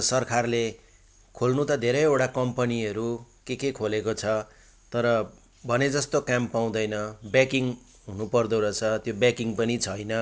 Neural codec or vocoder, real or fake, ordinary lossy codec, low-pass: none; real; none; none